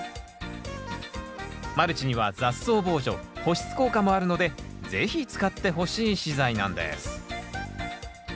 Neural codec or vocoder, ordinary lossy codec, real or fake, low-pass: none; none; real; none